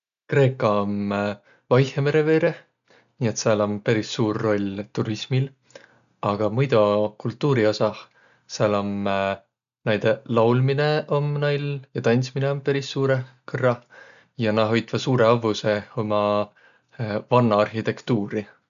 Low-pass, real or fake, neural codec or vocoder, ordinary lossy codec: 7.2 kHz; real; none; none